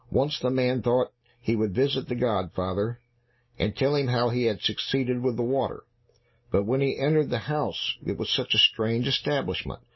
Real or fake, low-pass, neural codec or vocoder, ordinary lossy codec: real; 7.2 kHz; none; MP3, 24 kbps